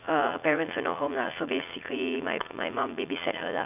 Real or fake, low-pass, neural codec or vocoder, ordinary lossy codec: fake; 3.6 kHz; vocoder, 44.1 kHz, 80 mel bands, Vocos; none